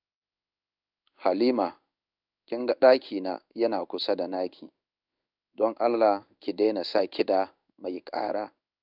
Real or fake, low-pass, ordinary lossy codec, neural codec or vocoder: fake; 5.4 kHz; none; codec, 16 kHz in and 24 kHz out, 1 kbps, XY-Tokenizer